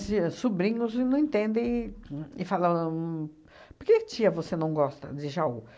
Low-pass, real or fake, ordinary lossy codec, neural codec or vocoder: none; real; none; none